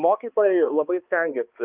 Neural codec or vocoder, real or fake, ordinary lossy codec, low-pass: codec, 16 kHz, 2 kbps, X-Codec, HuBERT features, trained on balanced general audio; fake; Opus, 32 kbps; 3.6 kHz